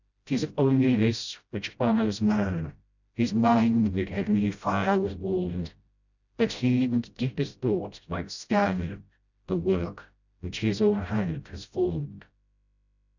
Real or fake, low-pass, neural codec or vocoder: fake; 7.2 kHz; codec, 16 kHz, 0.5 kbps, FreqCodec, smaller model